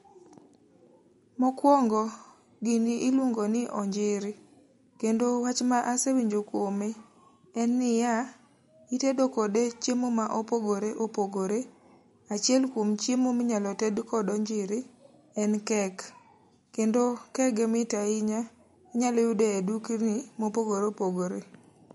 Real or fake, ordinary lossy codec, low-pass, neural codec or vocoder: real; MP3, 48 kbps; 19.8 kHz; none